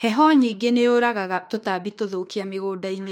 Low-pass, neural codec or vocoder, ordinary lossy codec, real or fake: 19.8 kHz; autoencoder, 48 kHz, 32 numbers a frame, DAC-VAE, trained on Japanese speech; MP3, 64 kbps; fake